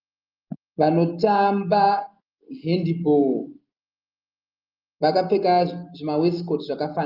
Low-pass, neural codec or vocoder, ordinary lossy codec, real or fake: 5.4 kHz; none; Opus, 32 kbps; real